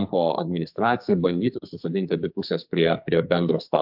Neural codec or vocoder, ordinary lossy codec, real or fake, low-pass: codec, 44.1 kHz, 2.6 kbps, SNAC; AAC, 48 kbps; fake; 5.4 kHz